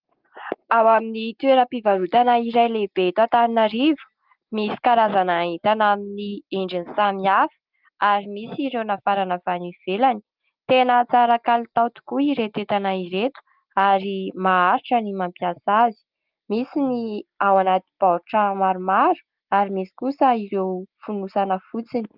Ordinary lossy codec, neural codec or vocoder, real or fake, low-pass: Opus, 32 kbps; none; real; 5.4 kHz